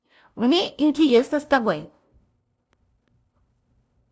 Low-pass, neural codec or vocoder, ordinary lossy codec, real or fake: none; codec, 16 kHz, 0.5 kbps, FunCodec, trained on LibriTTS, 25 frames a second; none; fake